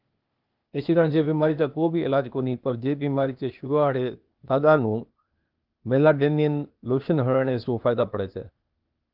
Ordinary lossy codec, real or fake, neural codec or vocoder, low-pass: Opus, 32 kbps; fake; codec, 16 kHz, 0.8 kbps, ZipCodec; 5.4 kHz